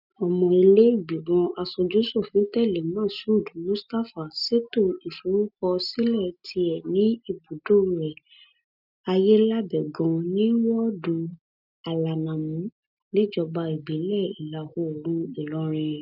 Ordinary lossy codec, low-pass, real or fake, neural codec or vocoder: none; 5.4 kHz; real; none